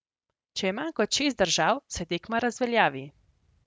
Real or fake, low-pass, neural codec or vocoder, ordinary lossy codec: real; none; none; none